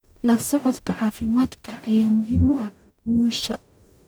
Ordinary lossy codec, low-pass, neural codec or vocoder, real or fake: none; none; codec, 44.1 kHz, 0.9 kbps, DAC; fake